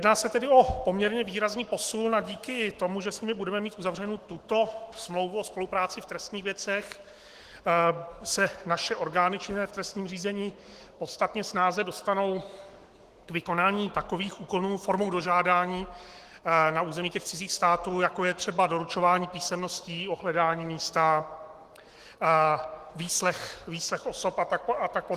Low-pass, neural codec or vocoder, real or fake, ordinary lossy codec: 14.4 kHz; autoencoder, 48 kHz, 128 numbers a frame, DAC-VAE, trained on Japanese speech; fake; Opus, 16 kbps